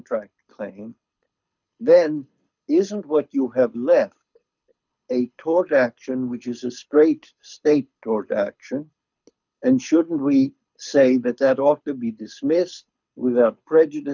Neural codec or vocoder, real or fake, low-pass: codec, 24 kHz, 6 kbps, HILCodec; fake; 7.2 kHz